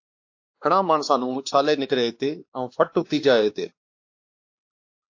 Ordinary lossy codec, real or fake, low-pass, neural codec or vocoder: AAC, 48 kbps; fake; 7.2 kHz; codec, 16 kHz, 2 kbps, X-Codec, WavLM features, trained on Multilingual LibriSpeech